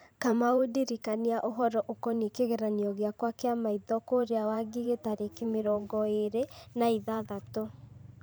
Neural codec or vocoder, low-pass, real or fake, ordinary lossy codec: vocoder, 44.1 kHz, 128 mel bands every 512 samples, BigVGAN v2; none; fake; none